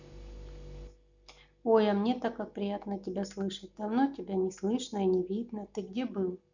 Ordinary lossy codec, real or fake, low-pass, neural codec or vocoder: none; real; 7.2 kHz; none